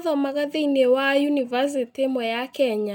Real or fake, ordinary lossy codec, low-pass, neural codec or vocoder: real; none; 19.8 kHz; none